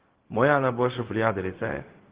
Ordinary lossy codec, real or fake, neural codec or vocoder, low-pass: Opus, 16 kbps; fake; codec, 16 kHz, 0.4 kbps, LongCat-Audio-Codec; 3.6 kHz